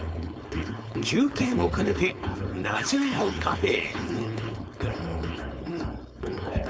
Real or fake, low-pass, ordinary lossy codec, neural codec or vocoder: fake; none; none; codec, 16 kHz, 4.8 kbps, FACodec